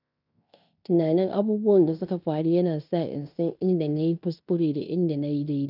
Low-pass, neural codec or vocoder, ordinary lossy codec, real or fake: 5.4 kHz; codec, 16 kHz in and 24 kHz out, 0.9 kbps, LongCat-Audio-Codec, fine tuned four codebook decoder; none; fake